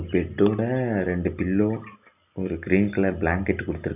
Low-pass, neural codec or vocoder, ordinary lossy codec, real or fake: 3.6 kHz; none; none; real